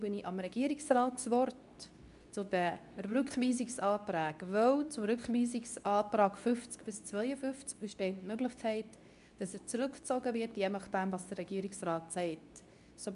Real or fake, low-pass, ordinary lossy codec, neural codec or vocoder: fake; 10.8 kHz; MP3, 96 kbps; codec, 24 kHz, 0.9 kbps, WavTokenizer, medium speech release version 2